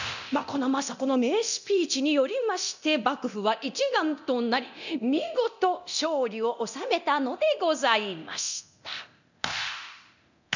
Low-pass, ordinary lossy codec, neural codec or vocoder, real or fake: 7.2 kHz; none; codec, 24 kHz, 0.9 kbps, DualCodec; fake